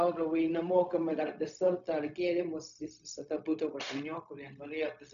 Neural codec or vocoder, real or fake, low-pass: codec, 16 kHz, 0.4 kbps, LongCat-Audio-Codec; fake; 7.2 kHz